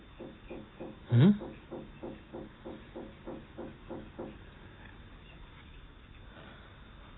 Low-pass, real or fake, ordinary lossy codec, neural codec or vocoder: 7.2 kHz; real; AAC, 16 kbps; none